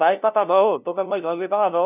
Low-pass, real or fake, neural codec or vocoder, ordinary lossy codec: 3.6 kHz; fake; codec, 16 kHz, 0.5 kbps, FunCodec, trained on LibriTTS, 25 frames a second; none